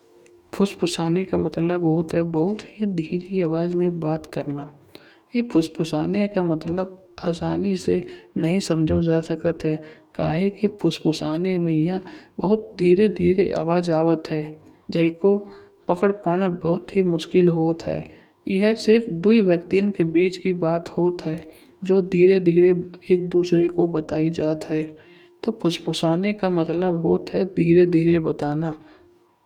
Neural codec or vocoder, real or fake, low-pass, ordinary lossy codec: codec, 44.1 kHz, 2.6 kbps, DAC; fake; 19.8 kHz; none